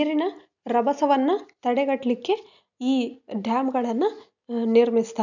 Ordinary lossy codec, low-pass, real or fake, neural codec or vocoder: none; 7.2 kHz; real; none